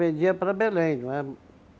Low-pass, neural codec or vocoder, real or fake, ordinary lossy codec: none; none; real; none